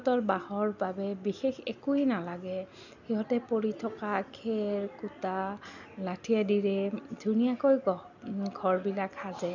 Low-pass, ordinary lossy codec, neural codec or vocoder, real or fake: 7.2 kHz; none; none; real